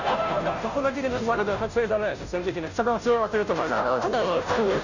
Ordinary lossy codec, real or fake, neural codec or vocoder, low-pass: none; fake; codec, 16 kHz, 0.5 kbps, FunCodec, trained on Chinese and English, 25 frames a second; 7.2 kHz